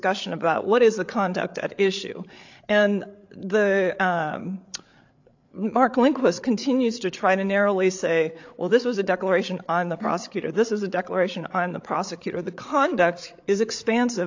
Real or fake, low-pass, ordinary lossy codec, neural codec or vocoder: fake; 7.2 kHz; AAC, 48 kbps; codec, 16 kHz, 8 kbps, FreqCodec, larger model